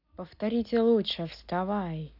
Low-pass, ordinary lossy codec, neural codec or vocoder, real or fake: 5.4 kHz; none; none; real